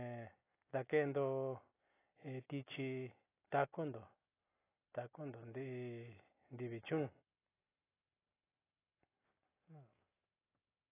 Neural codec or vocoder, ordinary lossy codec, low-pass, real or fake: none; AAC, 16 kbps; 3.6 kHz; real